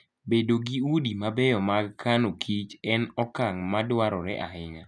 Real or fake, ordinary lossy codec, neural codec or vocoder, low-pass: real; none; none; none